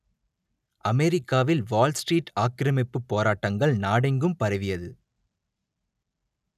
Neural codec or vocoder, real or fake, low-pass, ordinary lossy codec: none; real; 14.4 kHz; none